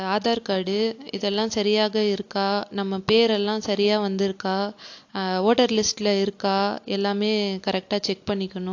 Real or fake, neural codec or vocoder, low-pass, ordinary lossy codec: real; none; 7.2 kHz; AAC, 48 kbps